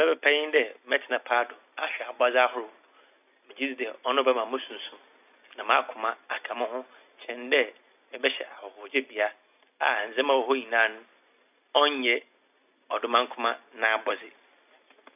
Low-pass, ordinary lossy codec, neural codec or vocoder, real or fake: 3.6 kHz; none; none; real